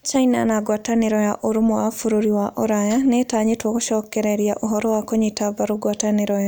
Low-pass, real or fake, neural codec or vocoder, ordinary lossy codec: none; real; none; none